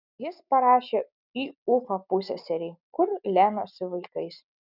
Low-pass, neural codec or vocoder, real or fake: 5.4 kHz; none; real